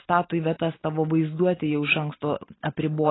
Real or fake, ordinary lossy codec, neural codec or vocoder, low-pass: real; AAC, 16 kbps; none; 7.2 kHz